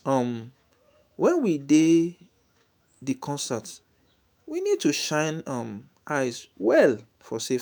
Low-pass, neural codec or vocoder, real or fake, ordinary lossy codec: none; autoencoder, 48 kHz, 128 numbers a frame, DAC-VAE, trained on Japanese speech; fake; none